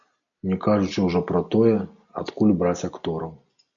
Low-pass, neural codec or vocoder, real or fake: 7.2 kHz; none; real